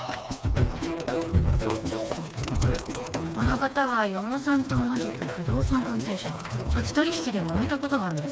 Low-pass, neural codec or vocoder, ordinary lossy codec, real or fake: none; codec, 16 kHz, 2 kbps, FreqCodec, smaller model; none; fake